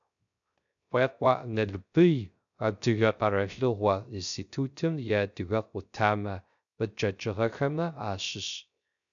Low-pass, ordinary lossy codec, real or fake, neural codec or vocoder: 7.2 kHz; MP3, 96 kbps; fake; codec, 16 kHz, 0.3 kbps, FocalCodec